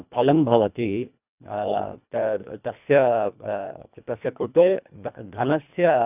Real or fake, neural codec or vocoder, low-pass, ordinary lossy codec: fake; codec, 24 kHz, 1.5 kbps, HILCodec; 3.6 kHz; none